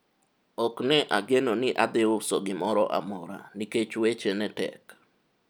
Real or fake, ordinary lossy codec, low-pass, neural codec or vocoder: real; none; none; none